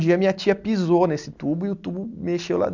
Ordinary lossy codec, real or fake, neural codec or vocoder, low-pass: none; real; none; 7.2 kHz